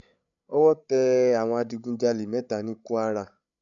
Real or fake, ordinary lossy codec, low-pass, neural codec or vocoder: fake; none; 7.2 kHz; codec, 16 kHz, 16 kbps, FreqCodec, larger model